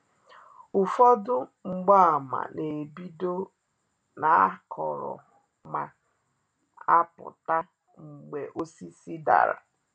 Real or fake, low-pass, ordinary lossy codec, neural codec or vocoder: real; none; none; none